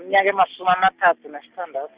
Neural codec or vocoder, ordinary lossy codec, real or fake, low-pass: none; Opus, 64 kbps; real; 3.6 kHz